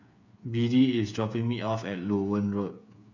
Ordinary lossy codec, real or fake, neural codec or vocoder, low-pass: none; fake; codec, 16 kHz, 8 kbps, FreqCodec, smaller model; 7.2 kHz